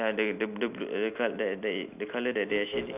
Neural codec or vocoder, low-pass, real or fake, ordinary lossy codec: none; 3.6 kHz; real; none